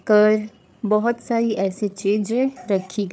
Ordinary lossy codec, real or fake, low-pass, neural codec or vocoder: none; fake; none; codec, 16 kHz, 8 kbps, FreqCodec, larger model